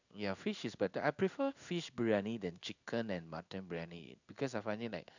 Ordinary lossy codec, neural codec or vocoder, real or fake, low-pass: none; codec, 16 kHz in and 24 kHz out, 1 kbps, XY-Tokenizer; fake; 7.2 kHz